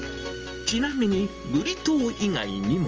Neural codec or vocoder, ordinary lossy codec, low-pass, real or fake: none; Opus, 24 kbps; 7.2 kHz; real